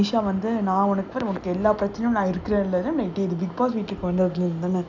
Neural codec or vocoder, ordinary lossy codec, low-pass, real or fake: none; none; 7.2 kHz; real